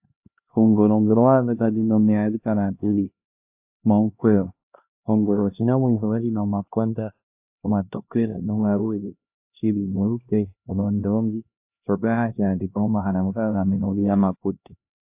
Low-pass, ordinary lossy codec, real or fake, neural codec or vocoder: 3.6 kHz; AAC, 32 kbps; fake; codec, 16 kHz, 1 kbps, X-Codec, HuBERT features, trained on LibriSpeech